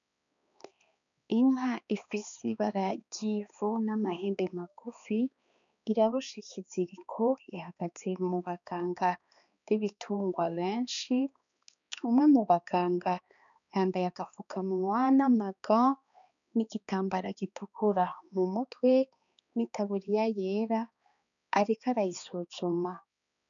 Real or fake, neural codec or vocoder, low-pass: fake; codec, 16 kHz, 2 kbps, X-Codec, HuBERT features, trained on balanced general audio; 7.2 kHz